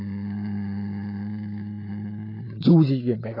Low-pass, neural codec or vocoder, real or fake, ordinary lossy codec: 5.4 kHz; none; real; AAC, 24 kbps